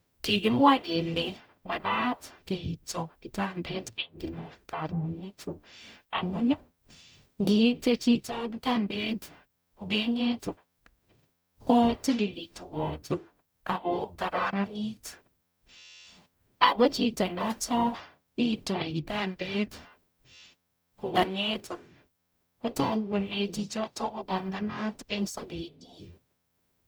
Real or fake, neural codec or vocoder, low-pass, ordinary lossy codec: fake; codec, 44.1 kHz, 0.9 kbps, DAC; none; none